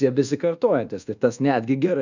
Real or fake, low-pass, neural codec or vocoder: fake; 7.2 kHz; codec, 24 kHz, 1.2 kbps, DualCodec